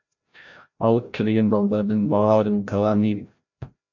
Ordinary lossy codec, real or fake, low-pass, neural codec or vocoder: MP3, 48 kbps; fake; 7.2 kHz; codec, 16 kHz, 0.5 kbps, FreqCodec, larger model